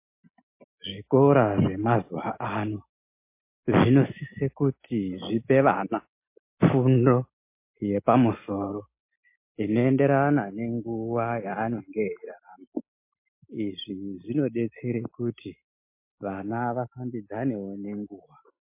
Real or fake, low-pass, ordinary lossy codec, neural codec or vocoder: real; 3.6 kHz; MP3, 24 kbps; none